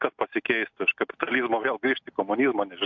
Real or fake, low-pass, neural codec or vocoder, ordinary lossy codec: real; 7.2 kHz; none; MP3, 64 kbps